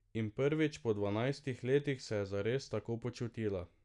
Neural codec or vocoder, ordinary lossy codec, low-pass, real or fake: none; none; 10.8 kHz; real